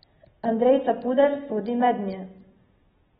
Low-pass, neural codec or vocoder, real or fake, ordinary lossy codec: 7.2 kHz; none; real; AAC, 16 kbps